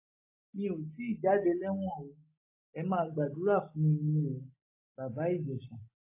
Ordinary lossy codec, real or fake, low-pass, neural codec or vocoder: AAC, 24 kbps; real; 3.6 kHz; none